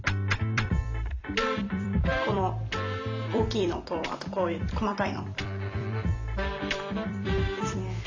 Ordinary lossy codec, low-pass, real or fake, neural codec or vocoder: AAC, 48 kbps; 7.2 kHz; fake; vocoder, 44.1 kHz, 128 mel bands every 256 samples, BigVGAN v2